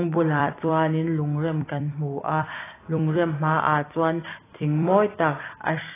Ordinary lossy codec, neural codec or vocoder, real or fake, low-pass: AAC, 16 kbps; vocoder, 44.1 kHz, 128 mel bands every 256 samples, BigVGAN v2; fake; 3.6 kHz